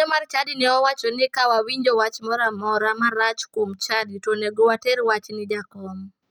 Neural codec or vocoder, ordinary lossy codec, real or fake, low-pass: none; none; real; 19.8 kHz